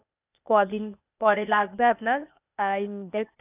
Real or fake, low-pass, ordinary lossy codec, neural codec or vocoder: fake; 3.6 kHz; none; codec, 16 kHz, 0.8 kbps, ZipCodec